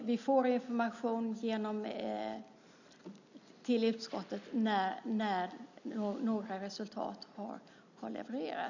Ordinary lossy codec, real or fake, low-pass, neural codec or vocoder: none; real; 7.2 kHz; none